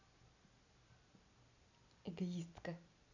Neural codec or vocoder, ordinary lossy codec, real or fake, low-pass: none; none; real; 7.2 kHz